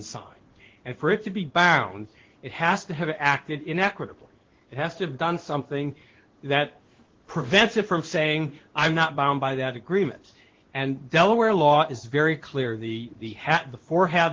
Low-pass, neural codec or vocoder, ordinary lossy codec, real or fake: 7.2 kHz; codec, 16 kHz in and 24 kHz out, 1 kbps, XY-Tokenizer; Opus, 16 kbps; fake